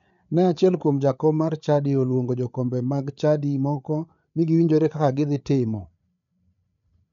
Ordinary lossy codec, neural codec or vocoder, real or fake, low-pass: none; codec, 16 kHz, 8 kbps, FreqCodec, larger model; fake; 7.2 kHz